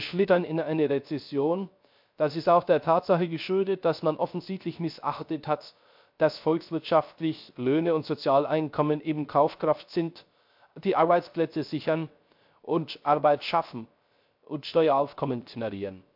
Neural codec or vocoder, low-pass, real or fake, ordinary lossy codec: codec, 16 kHz, 0.3 kbps, FocalCodec; 5.4 kHz; fake; MP3, 48 kbps